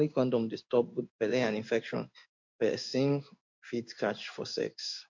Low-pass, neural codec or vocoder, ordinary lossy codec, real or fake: 7.2 kHz; codec, 16 kHz in and 24 kHz out, 1 kbps, XY-Tokenizer; MP3, 48 kbps; fake